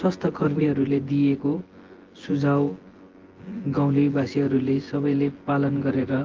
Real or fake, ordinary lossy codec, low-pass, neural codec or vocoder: fake; Opus, 16 kbps; 7.2 kHz; vocoder, 24 kHz, 100 mel bands, Vocos